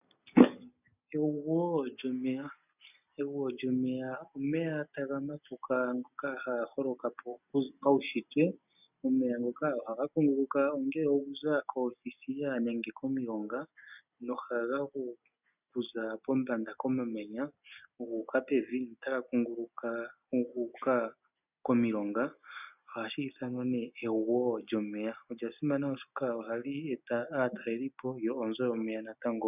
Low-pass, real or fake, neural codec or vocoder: 3.6 kHz; real; none